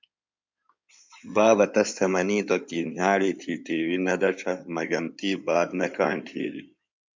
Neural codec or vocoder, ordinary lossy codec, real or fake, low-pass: codec, 16 kHz in and 24 kHz out, 2.2 kbps, FireRedTTS-2 codec; MP3, 64 kbps; fake; 7.2 kHz